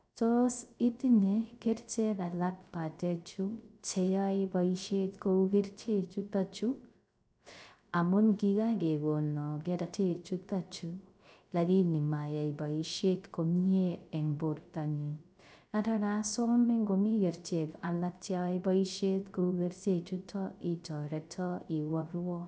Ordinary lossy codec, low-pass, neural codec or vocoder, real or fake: none; none; codec, 16 kHz, 0.3 kbps, FocalCodec; fake